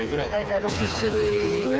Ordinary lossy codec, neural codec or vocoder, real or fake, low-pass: none; codec, 16 kHz, 4 kbps, FreqCodec, smaller model; fake; none